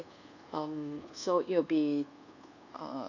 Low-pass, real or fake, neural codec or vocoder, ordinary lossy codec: 7.2 kHz; fake; codec, 24 kHz, 1.2 kbps, DualCodec; none